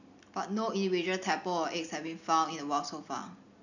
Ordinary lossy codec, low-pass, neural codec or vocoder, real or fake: none; 7.2 kHz; none; real